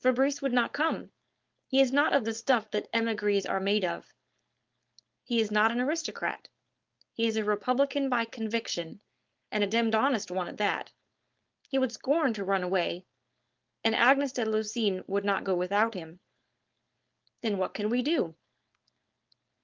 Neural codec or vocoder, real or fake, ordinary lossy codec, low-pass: codec, 16 kHz, 4.8 kbps, FACodec; fake; Opus, 32 kbps; 7.2 kHz